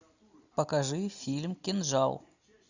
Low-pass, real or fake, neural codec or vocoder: 7.2 kHz; real; none